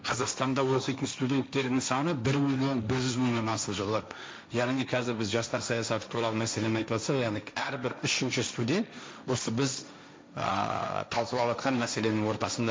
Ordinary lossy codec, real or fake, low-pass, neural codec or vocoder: none; fake; none; codec, 16 kHz, 1.1 kbps, Voila-Tokenizer